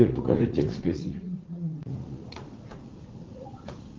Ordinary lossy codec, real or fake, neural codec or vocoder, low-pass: Opus, 16 kbps; fake; vocoder, 44.1 kHz, 80 mel bands, Vocos; 7.2 kHz